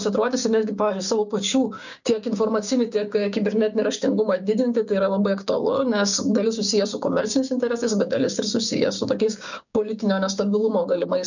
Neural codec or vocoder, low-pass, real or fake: codec, 16 kHz, 6 kbps, DAC; 7.2 kHz; fake